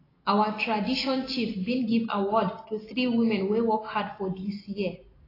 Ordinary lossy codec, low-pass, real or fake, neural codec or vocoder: AAC, 24 kbps; 5.4 kHz; real; none